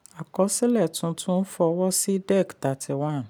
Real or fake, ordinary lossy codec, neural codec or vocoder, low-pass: real; none; none; none